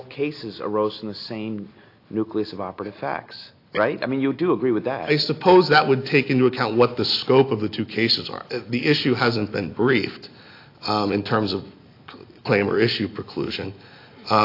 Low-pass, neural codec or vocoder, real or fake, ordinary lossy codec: 5.4 kHz; none; real; AAC, 32 kbps